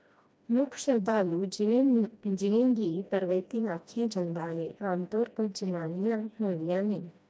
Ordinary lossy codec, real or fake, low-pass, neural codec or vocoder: none; fake; none; codec, 16 kHz, 1 kbps, FreqCodec, smaller model